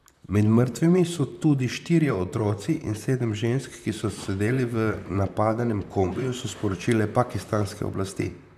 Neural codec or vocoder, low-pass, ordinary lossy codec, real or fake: vocoder, 44.1 kHz, 128 mel bands, Pupu-Vocoder; 14.4 kHz; none; fake